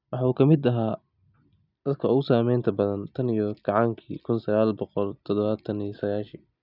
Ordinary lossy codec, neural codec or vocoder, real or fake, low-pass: none; none; real; 5.4 kHz